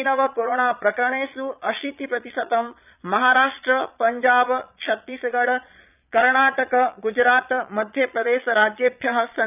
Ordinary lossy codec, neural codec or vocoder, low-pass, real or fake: none; vocoder, 22.05 kHz, 80 mel bands, Vocos; 3.6 kHz; fake